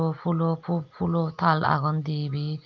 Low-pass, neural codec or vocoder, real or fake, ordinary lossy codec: 7.2 kHz; none; real; Opus, 24 kbps